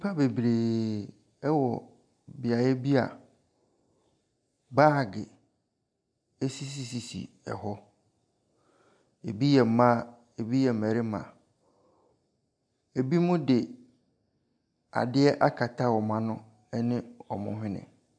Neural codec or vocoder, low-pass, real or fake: none; 9.9 kHz; real